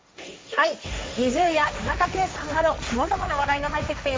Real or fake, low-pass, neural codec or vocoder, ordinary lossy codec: fake; none; codec, 16 kHz, 1.1 kbps, Voila-Tokenizer; none